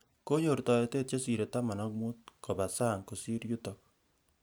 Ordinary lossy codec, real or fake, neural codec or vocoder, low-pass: none; real; none; none